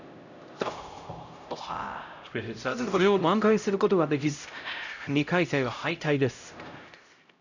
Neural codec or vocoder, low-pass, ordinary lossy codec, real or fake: codec, 16 kHz, 0.5 kbps, X-Codec, HuBERT features, trained on LibriSpeech; 7.2 kHz; none; fake